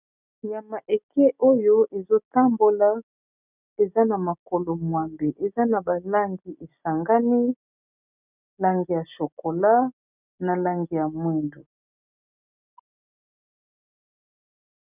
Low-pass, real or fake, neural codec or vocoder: 3.6 kHz; real; none